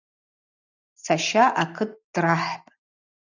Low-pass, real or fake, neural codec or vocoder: 7.2 kHz; real; none